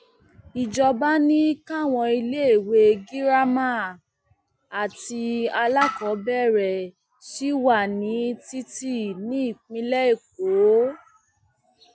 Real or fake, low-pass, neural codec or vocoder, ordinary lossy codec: real; none; none; none